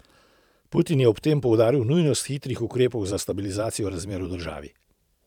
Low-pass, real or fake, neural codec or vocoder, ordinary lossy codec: 19.8 kHz; fake; vocoder, 44.1 kHz, 128 mel bands every 512 samples, BigVGAN v2; none